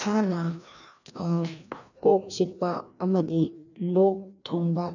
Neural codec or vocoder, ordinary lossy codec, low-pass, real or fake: codec, 16 kHz, 1 kbps, FreqCodec, larger model; none; 7.2 kHz; fake